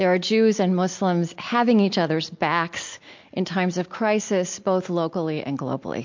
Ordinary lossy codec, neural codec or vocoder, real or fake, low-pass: MP3, 48 kbps; none; real; 7.2 kHz